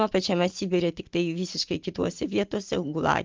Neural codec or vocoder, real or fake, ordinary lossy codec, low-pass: none; real; Opus, 32 kbps; 7.2 kHz